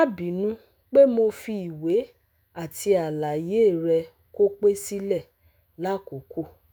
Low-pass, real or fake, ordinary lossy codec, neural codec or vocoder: none; fake; none; autoencoder, 48 kHz, 128 numbers a frame, DAC-VAE, trained on Japanese speech